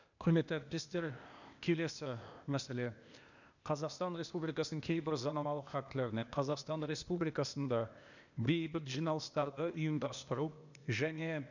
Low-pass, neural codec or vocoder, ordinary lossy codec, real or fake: 7.2 kHz; codec, 16 kHz, 0.8 kbps, ZipCodec; none; fake